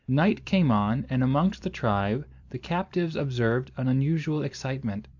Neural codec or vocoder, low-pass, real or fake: vocoder, 44.1 kHz, 128 mel bands every 256 samples, BigVGAN v2; 7.2 kHz; fake